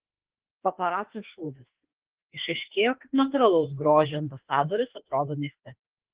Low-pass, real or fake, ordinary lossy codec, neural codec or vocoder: 3.6 kHz; fake; Opus, 16 kbps; autoencoder, 48 kHz, 32 numbers a frame, DAC-VAE, trained on Japanese speech